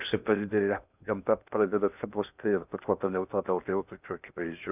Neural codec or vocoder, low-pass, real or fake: codec, 16 kHz in and 24 kHz out, 0.6 kbps, FocalCodec, streaming, 2048 codes; 3.6 kHz; fake